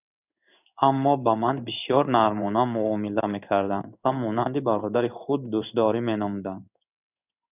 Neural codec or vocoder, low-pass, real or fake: codec, 16 kHz in and 24 kHz out, 1 kbps, XY-Tokenizer; 3.6 kHz; fake